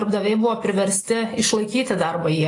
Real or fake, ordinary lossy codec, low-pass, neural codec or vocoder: real; AAC, 32 kbps; 10.8 kHz; none